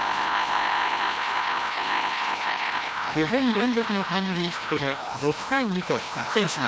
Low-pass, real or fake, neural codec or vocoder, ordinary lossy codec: none; fake; codec, 16 kHz, 1 kbps, FreqCodec, larger model; none